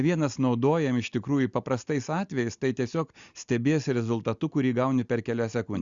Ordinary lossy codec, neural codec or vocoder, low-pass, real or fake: Opus, 64 kbps; none; 7.2 kHz; real